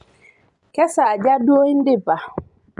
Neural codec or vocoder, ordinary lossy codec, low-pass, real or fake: vocoder, 24 kHz, 100 mel bands, Vocos; none; 10.8 kHz; fake